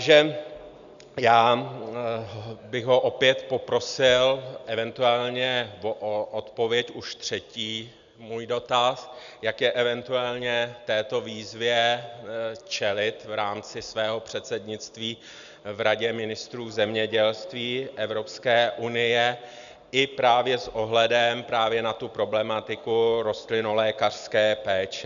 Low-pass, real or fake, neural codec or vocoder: 7.2 kHz; real; none